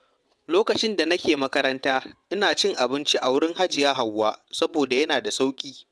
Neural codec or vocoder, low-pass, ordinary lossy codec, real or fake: vocoder, 22.05 kHz, 80 mel bands, WaveNeXt; none; none; fake